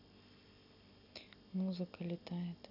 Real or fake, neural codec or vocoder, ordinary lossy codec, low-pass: real; none; none; 5.4 kHz